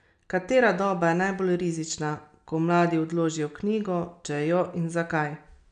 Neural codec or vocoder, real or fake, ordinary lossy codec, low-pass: none; real; none; 9.9 kHz